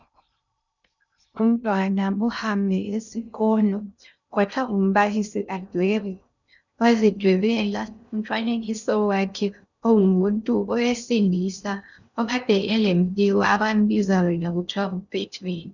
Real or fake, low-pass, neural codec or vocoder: fake; 7.2 kHz; codec, 16 kHz in and 24 kHz out, 0.6 kbps, FocalCodec, streaming, 2048 codes